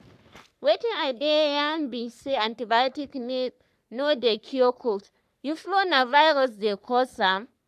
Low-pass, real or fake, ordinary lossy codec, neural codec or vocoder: 14.4 kHz; fake; none; codec, 44.1 kHz, 3.4 kbps, Pupu-Codec